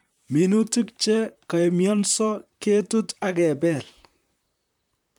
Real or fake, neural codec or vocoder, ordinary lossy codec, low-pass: fake; vocoder, 44.1 kHz, 128 mel bands, Pupu-Vocoder; none; 19.8 kHz